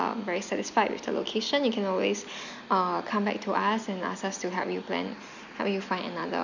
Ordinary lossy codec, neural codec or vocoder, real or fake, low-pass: none; none; real; 7.2 kHz